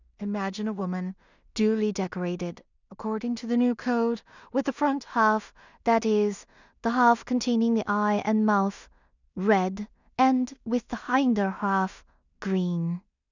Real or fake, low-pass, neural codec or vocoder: fake; 7.2 kHz; codec, 16 kHz in and 24 kHz out, 0.4 kbps, LongCat-Audio-Codec, two codebook decoder